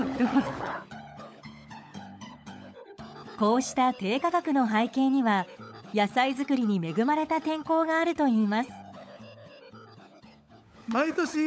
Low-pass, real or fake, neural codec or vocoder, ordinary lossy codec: none; fake; codec, 16 kHz, 16 kbps, FunCodec, trained on LibriTTS, 50 frames a second; none